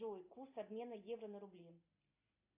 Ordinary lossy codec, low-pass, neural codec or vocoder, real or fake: AAC, 32 kbps; 3.6 kHz; none; real